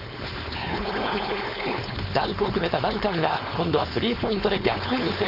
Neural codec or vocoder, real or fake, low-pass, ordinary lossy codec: codec, 16 kHz, 4.8 kbps, FACodec; fake; 5.4 kHz; none